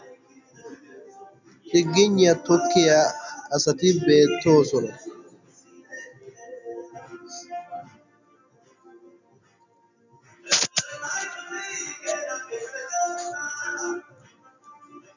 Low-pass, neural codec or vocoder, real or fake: 7.2 kHz; none; real